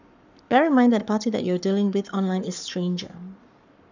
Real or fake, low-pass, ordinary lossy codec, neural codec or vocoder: fake; 7.2 kHz; none; codec, 44.1 kHz, 7.8 kbps, Pupu-Codec